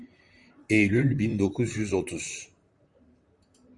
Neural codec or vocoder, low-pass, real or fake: vocoder, 44.1 kHz, 128 mel bands, Pupu-Vocoder; 10.8 kHz; fake